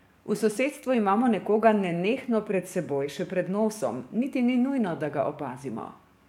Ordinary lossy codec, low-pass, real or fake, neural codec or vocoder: MP3, 96 kbps; 19.8 kHz; fake; codec, 44.1 kHz, 7.8 kbps, DAC